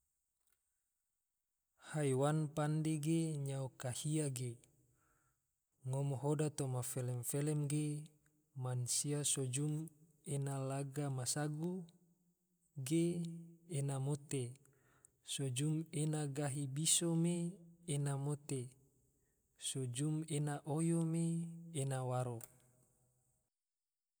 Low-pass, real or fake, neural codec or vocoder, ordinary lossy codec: none; real; none; none